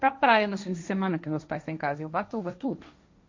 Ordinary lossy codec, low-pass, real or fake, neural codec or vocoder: MP3, 48 kbps; 7.2 kHz; fake; codec, 16 kHz, 1.1 kbps, Voila-Tokenizer